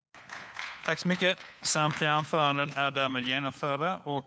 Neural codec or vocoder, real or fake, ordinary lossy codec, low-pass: codec, 16 kHz, 4 kbps, FunCodec, trained on LibriTTS, 50 frames a second; fake; none; none